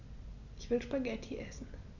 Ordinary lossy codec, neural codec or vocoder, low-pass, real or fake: none; none; 7.2 kHz; real